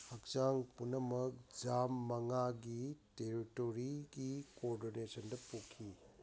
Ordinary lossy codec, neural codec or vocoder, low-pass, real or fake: none; none; none; real